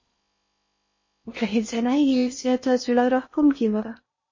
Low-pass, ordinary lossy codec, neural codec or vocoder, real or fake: 7.2 kHz; MP3, 32 kbps; codec, 16 kHz in and 24 kHz out, 0.6 kbps, FocalCodec, streaming, 4096 codes; fake